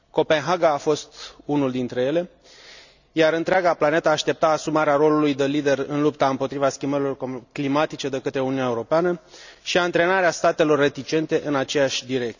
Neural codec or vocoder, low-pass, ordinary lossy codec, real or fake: none; 7.2 kHz; none; real